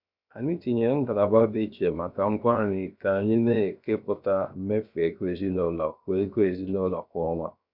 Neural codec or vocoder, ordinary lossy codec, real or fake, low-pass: codec, 16 kHz, 0.7 kbps, FocalCodec; none; fake; 5.4 kHz